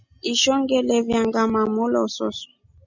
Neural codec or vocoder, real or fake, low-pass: none; real; 7.2 kHz